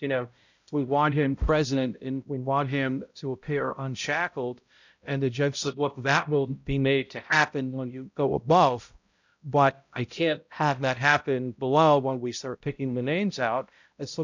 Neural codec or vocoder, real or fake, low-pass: codec, 16 kHz, 0.5 kbps, X-Codec, HuBERT features, trained on balanced general audio; fake; 7.2 kHz